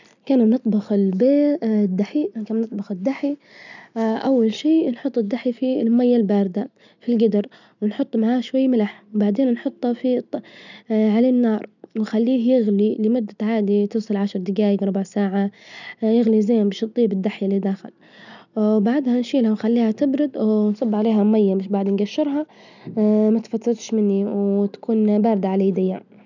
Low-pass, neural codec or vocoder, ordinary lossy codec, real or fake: 7.2 kHz; none; none; real